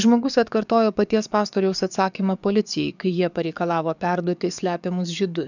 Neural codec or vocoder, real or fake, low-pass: codec, 44.1 kHz, 7.8 kbps, DAC; fake; 7.2 kHz